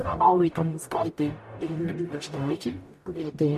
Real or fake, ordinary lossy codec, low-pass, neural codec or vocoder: fake; MP3, 64 kbps; 14.4 kHz; codec, 44.1 kHz, 0.9 kbps, DAC